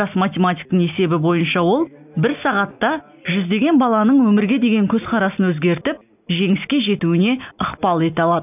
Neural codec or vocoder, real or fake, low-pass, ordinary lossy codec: none; real; 3.6 kHz; none